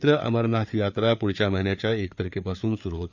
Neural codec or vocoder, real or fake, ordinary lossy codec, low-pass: codec, 16 kHz, 4 kbps, FreqCodec, larger model; fake; none; 7.2 kHz